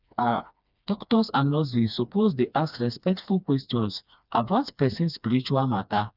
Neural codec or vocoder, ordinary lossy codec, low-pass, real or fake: codec, 16 kHz, 2 kbps, FreqCodec, smaller model; none; 5.4 kHz; fake